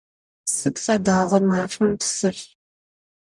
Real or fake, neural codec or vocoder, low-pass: fake; codec, 44.1 kHz, 0.9 kbps, DAC; 10.8 kHz